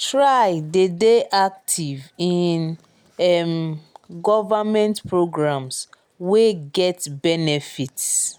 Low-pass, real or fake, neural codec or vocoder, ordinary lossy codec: none; real; none; none